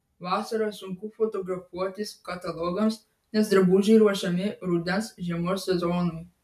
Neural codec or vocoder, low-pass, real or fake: none; 14.4 kHz; real